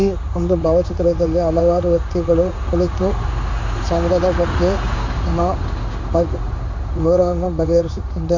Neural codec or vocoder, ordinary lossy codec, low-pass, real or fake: codec, 16 kHz in and 24 kHz out, 1 kbps, XY-Tokenizer; AAC, 48 kbps; 7.2 kHz; fake